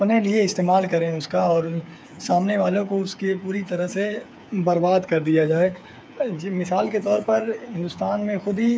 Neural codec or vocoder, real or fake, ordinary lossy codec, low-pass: codec, 16 kHz, 8 kbps, FreqCodec, smaller model; fake; none; none